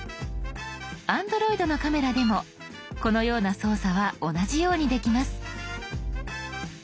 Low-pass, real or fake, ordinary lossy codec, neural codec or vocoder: none; real; none; none